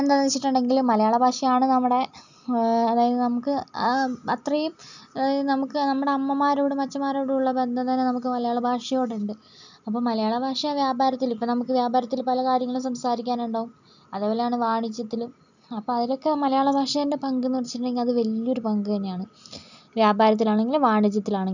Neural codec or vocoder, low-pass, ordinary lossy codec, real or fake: none; 7.2 kHz; none; real